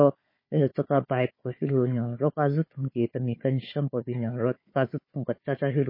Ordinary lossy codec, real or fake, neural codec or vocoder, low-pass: MP3, 24 kbps; fake; codec, 16 kHz, 4 kbps, FunCodec, trained on Chinese and English, 50 frames a second; 5.4 kHz